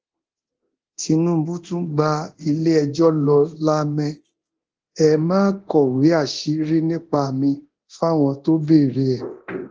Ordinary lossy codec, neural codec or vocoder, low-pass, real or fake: Opus, 16 kbps; codec, 24 kHz, 0.9 kbps, DualCodec; 7.2 kHz; fake